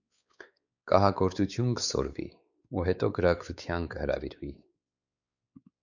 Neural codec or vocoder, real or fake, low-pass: codec, 16 kHz, 4 kbps, X-Codec, WavLM features, trained on Multilingual LibriSpeech; fake; 7.2 kHz